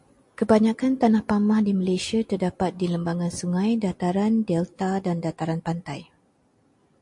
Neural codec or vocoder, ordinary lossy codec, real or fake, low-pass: none; MP3, 48 kbps; real; 10.8 kHz